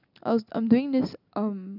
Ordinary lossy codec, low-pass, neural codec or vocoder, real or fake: none; 5.4 kHz; none; real